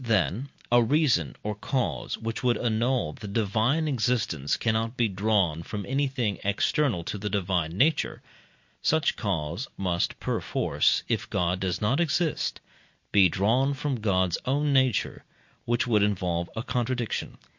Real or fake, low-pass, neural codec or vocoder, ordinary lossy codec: real; 7.2 kHz; none; MP3, 48 kbps